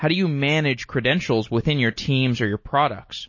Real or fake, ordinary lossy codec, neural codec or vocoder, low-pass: real; MP3, 32 kbps; none; 7.2 kHz